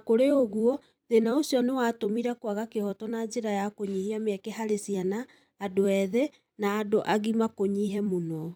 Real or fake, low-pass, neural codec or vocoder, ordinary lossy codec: fake; none; vocoder, 44.1 kHz, 128 mel bands every 256 samples, BigVGAN v2; none